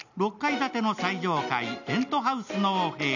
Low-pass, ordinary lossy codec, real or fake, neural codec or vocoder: 7.2 kHz; none; real; none